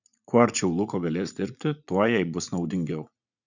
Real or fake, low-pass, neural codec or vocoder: fake; 7.2 kHz; vocoder, 44.1 kHz, 80 mel bands, Vocos